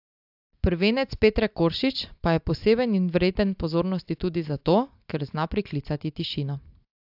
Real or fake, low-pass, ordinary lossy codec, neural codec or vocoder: real; 5.4 kHz; AAC, 48 kbps; none